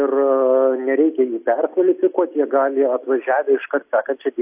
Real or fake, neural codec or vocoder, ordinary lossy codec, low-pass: real; none; AAC, 32 kbps; 3.6 kHz